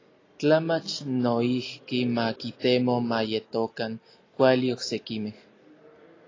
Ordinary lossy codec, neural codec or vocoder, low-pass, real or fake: AAC, 32 kbps; none; 7.2 kHz; real